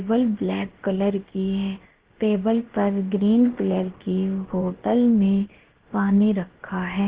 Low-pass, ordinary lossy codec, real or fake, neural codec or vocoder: 3.6 kHz; Opus, 16 kbps; fake; codec, 16 kHz, about 1 kbps, DyCAST, with the encoder's durations